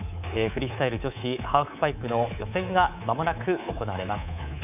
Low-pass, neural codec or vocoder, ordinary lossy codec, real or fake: 3.6 kHz; codec, 24 kHz, 3.1 kbps, DualCodec; none; fake